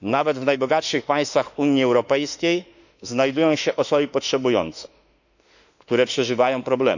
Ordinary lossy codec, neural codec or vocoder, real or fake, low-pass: none; autoencoder, 48 kHz, 32 numbers a frame, DAC-VAE, trained on Japanese speech; fake; 7.2 kHz